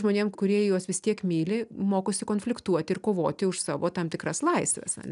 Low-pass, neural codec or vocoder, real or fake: 10.8 kHz; none; real